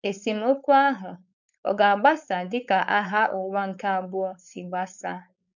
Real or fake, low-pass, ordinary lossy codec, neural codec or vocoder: fake; 7.2 kHz; none; codec, 16 kHz, 4.8 kbps, FACodec